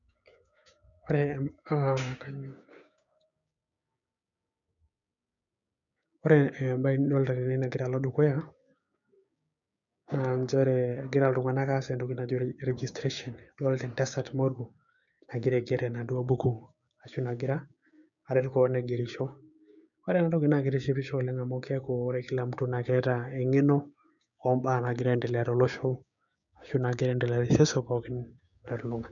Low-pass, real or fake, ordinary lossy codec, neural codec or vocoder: 7.2 kHz; fake; none; codec, 16 kHz, 6 kbps, DAC